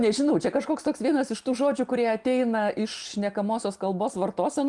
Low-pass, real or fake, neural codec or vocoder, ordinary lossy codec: 10.8 kHz; real; none; Opus, 24 kbps